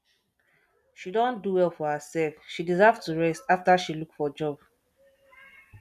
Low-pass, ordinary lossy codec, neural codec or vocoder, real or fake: 14.4 kHz; none; none; real